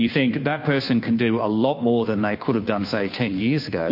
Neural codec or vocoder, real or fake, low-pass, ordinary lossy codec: codec, 16 kHz, 2 kbps, FunCodec, trained on Chinese and English, 25 frames a second; fake; 5.4 kHz; MP3, 32 kbps